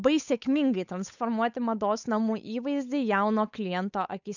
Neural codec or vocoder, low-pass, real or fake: codec, 16 kHz, 8 kbps, FunCodec, trained on Chinese and English, 25 frames a second; 7.2 kHz; fake